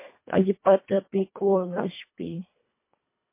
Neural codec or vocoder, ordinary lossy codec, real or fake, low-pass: codec, 24 kHz, 1.5 kbps, HILCodec; MP3, 24 kbps; fake; 3.6 kHz